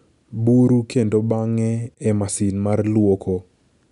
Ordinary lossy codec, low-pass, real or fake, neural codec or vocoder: none; 10.8 kHz; real; none